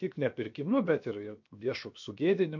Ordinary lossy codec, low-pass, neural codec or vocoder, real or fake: MP3, 48 kbps; 7.2 kHz; codec, 16 kHz, 0.7 kbps, FocalCodec; fake